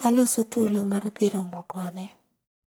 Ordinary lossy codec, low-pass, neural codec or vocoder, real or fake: none; none; codec, 44.1 kHz, 1.7 kbps, Pupu-Codec; fake